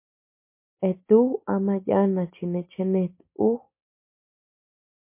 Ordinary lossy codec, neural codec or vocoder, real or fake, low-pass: MP3, 24 kbps; none; real; 3.6 kHz